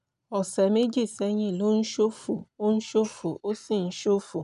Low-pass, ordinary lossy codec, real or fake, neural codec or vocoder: 10.8 kHz; none; real; none